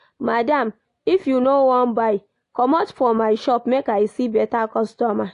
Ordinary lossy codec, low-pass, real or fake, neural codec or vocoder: AAC, 48 kbps; 10.8 kHz; real; none